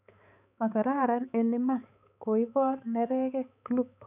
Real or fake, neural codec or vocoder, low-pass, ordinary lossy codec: fake; codec, 16 kHz, 4 kbps, X-Codec, HuBERT features, trained on balanced general audio; 3.6 kHz; none